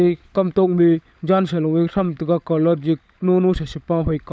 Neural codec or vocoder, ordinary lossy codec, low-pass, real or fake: codec, 16 kHz, 16 kbps, FunCodec, trained on LibriTTS, 50 frames a second; none; none; fake